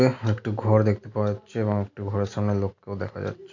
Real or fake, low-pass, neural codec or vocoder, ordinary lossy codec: real; 7.2 kHz; none; none